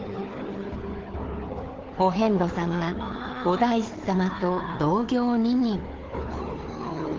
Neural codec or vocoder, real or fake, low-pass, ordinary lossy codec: codec, 16 kHz, 4 kbps, FunCodec, trained on Chinese and English, 50 frames a second; fake; 7.2 kHz; Opus, 16 kbps